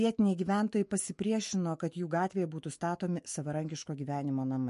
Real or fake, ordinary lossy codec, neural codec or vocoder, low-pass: fake; MP3, 48 kbps; autoencoder, 48 kHz, 128 numbers a frame, DAC-VAE, trained on Japanese speech; 14.4 kHz